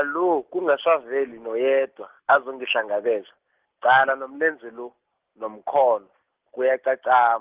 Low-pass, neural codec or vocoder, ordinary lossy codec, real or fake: 3.6 kHz; none; Opus, 16 kbps; real